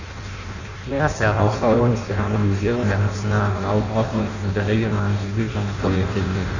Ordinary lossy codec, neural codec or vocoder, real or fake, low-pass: none; codec, 16 kHz in and 24 kHz out, 0.6 kbps, FireRedTTS-2 codec; fake; 7.2 kHz